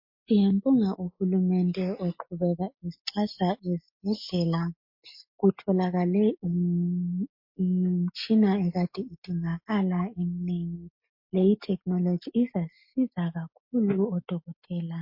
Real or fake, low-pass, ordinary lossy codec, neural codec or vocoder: real; 5.4 kHz; MP3, 32 kbps; none